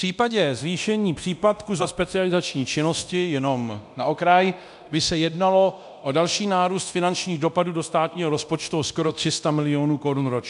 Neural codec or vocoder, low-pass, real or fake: codec, 24 kHz, 0.9 kbps, DualCodec; 10.8 kHz; fake